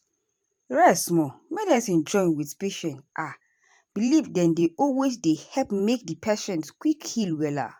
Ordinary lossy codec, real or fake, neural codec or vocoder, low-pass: none; fake; vocoder, 44.1 kHz, 128 mel bands every 512 samples, BigVGAN v2; 19.8 kHz